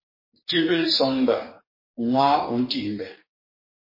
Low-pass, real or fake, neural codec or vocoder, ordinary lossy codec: 5.4 kHz; fake; codec, 44.1 kHz, 2.6 kbps, DAC; MP3, 24 kbps